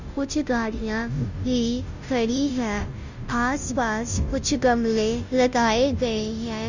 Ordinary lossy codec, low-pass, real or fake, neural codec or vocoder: none; 7.2 kHz; fake; codec, 16 kHz, 0.5 kbps, FunCodec, trained on Chinese and English, 25 frames a second